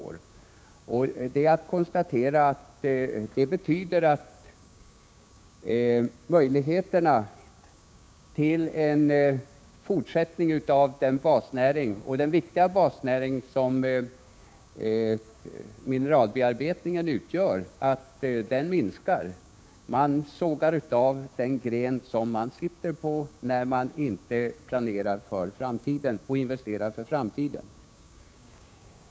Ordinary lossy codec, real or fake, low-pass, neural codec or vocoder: none; fake; none; codec, 16 kHz, 6 kbps, DAC